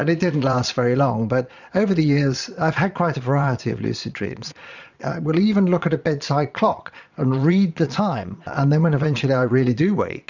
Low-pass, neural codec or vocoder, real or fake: 7.2 kHz; vocoder, 22.05 kHz, 80 mel bands, Vocos; fake